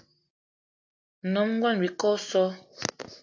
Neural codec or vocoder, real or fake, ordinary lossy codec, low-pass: none; real; AAC, 48 kbps; 7.2 kHz